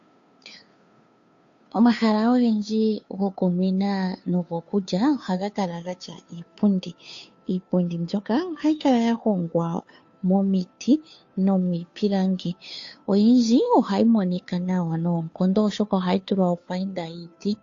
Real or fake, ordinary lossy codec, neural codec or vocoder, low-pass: fake; AAC, 48 kbps; codec, 16 kHz, 2 kbps, FunCodec, trained on Chinese and English, 25 frames a second; 7.2 kHz